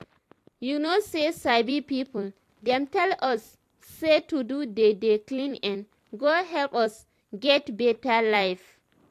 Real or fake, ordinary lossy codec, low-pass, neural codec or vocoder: fake; AAC, 64 kbps; 14.4 kHz; vocoder, 44.1 kHz, 128 mel bands every 256 samples, BigVGAN v2